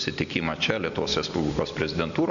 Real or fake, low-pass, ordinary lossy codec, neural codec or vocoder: real; 7.2 kHz; MP3, 96 kbps; none